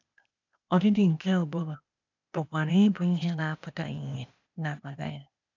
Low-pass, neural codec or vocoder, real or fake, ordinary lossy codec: 7.2 kHz; codec, 16 kHz, 0.8 kbps, ZipCodec; fake; none